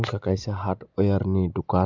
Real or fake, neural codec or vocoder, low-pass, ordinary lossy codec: real; none; 7.2 kHz; MP3, 64 kbps